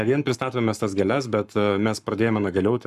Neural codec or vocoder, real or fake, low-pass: codec, 44.1 kHz, 7.8 kbps, Pupu-Codec; fake; 14.4 kHz